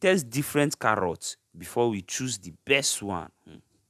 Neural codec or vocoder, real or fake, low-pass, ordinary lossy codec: none; real; 14.4 kHz; none